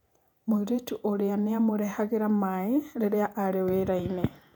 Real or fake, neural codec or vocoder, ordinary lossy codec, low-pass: fake; vocoder, 48 kHz, 128 mel bands, Vocos; none; 19.8 kHz